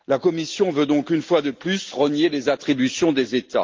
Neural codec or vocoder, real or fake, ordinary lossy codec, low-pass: none; real; Opus, 32 kbps; 7.2 kHz